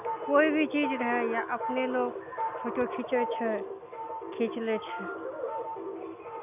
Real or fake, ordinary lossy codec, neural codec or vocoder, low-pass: real; none; none; 3.6 kHz